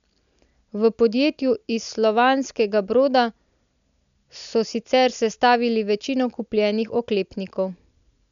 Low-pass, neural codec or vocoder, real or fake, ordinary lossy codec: 7.2 kHz; none; real; none